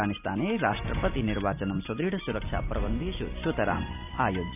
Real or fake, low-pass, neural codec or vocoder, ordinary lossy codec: real; 3.6 kHz; none; none